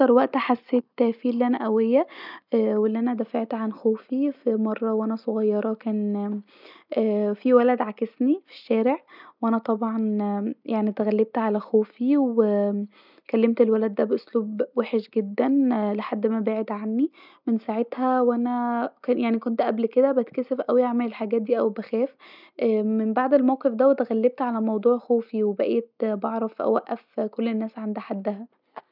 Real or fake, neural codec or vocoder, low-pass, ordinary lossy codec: real; none; 5.4 kHz; none